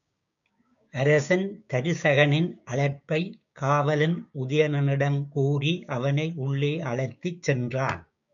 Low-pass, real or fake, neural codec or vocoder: 7.2 kHz; fake; codec, 16 kHz, 6 kbps, DAC